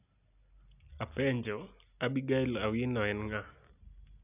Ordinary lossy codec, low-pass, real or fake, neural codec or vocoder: none; 3.6 kHz; real; none